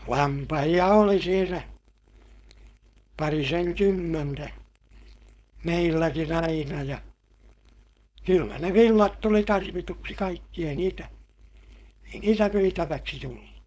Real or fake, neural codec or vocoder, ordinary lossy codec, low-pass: fake; codec, 16 kHz, 4.8 kbps, FACodec; none; none